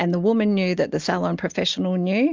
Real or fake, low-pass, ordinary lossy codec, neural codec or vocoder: real; 7.2 kHz; Opus, 32 kbps; none